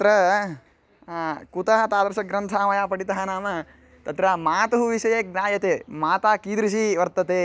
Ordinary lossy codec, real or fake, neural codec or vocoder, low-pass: none; real; none; none